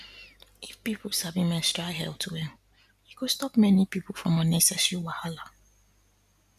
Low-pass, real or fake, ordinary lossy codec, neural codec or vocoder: 14.4 kHz; real; none; none